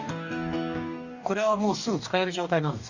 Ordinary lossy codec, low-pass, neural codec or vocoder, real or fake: Opus, 64 kbps; 7.2 kHz; codec, 44.1 kHz, 2.6 kbps, DAC; fake